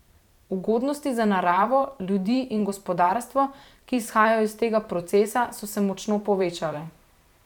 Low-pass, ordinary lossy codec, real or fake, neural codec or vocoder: 19.8 kHz; none; fake; vocoder, 44.1 kHz, 128 mel bands every 512 samples, BigVGAN v2